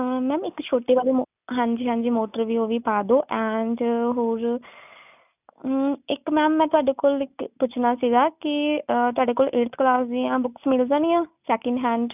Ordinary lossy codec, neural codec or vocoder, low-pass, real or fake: none; none; 3.6 kHz; real